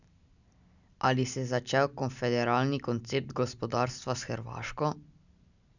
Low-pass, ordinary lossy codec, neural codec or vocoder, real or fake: 7.2 kHz; none; none; real